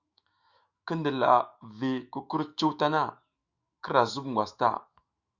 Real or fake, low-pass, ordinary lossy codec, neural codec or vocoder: fake; 7.2 kHz; Opus, 64 kbps; autoencoder, 48 kHz, 128 numbers a frame, DAC-VAE, trained on Japanese speech